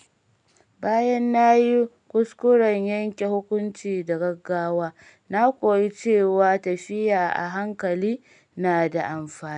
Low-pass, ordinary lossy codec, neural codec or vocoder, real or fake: 9.9 kHz; none; none; real